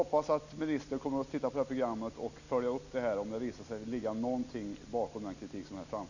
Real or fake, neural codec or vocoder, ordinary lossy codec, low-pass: real; none; none; 7.2 kHz